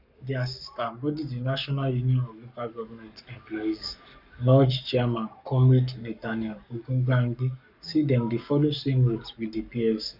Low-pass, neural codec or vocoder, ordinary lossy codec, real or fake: 5.4 kHz; codec, 44.1 kHz, 7.8 kbps, Pupu-Codec; none; fake